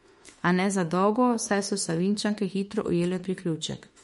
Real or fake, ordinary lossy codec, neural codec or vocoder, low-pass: fake; MP3, 48 kbps; autoencoder, 48 kHz, 32 numbers a frame, DAC-VAE, trained on Japanese speech; 19.8 kHz